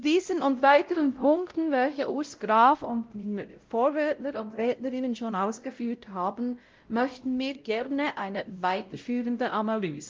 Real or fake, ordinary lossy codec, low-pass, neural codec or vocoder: fake; Opus, 24 kbps; 7.2 kHz; codec, 16 kHz, 0.5 kbps, X-Codec, WavLM features, trained on Multilingual LibriSpeech